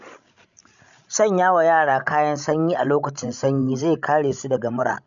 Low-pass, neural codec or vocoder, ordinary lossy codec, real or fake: 7.2 kHz; codec, 16 kHz, 16 kbps, FreqCodec, larger model; none; fake